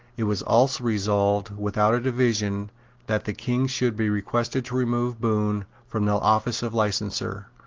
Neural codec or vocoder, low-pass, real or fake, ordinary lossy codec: none; 7.2 kHz; real; Opus, 16 kbps